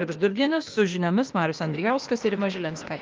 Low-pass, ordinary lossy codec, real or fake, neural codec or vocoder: 7.2 kHz; Opus, 32 kbps; fake; codec, 16 kHz, 0.8 kbps, ZipCodec